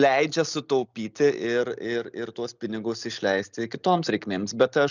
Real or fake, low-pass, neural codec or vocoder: real; 7.2 kHz; none